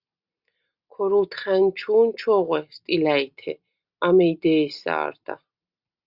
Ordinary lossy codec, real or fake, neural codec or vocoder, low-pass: Opus, 64 kbps; real; none; 5.4 kHz